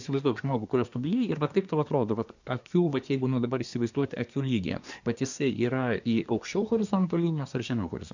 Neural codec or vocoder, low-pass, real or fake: codec, 24 kHz, 1 kbps, SNAC; 7.2 kHz; fake